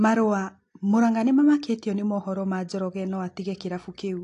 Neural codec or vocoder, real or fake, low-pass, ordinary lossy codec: none; real; 10.8 kHz; AAC, 48 kbps